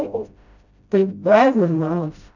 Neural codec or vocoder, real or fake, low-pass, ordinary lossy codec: codec, 16 kHz, 0.5 kbps, FreqCodec, smaller model; fake; 7.2 kHz; none